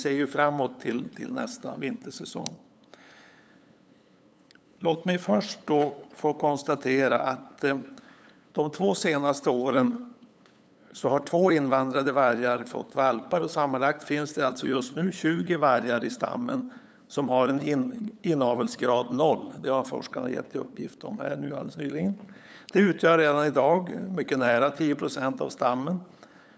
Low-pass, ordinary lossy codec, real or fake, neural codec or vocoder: none; none; fake; codec, 16 kHz, 8 kbps, FunCodec, trained on LibriTTS, 25 frames a second